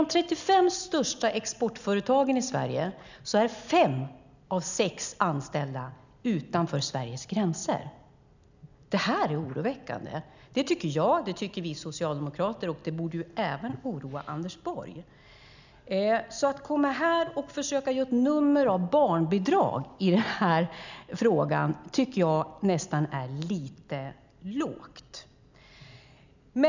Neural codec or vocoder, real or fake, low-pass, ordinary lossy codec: none; real; 7.2 kHz; none